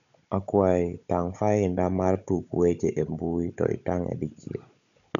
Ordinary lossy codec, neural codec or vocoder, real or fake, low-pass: none; codec, 16 kHz, 16 kbps, FunCodec, trained on Chinese and English, 50 frames a second; fake; 7.2 kHz